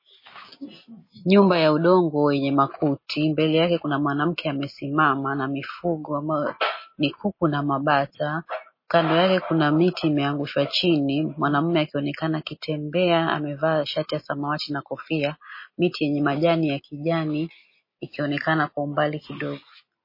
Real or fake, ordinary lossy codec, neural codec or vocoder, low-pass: real; MP3, 24 kbps; none; 5.4 kHz